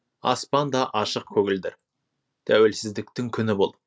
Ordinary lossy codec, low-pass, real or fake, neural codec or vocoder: none; none; real; none